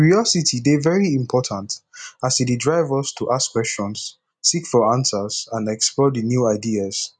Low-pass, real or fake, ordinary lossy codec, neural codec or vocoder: 9.9 kHz; real; none; none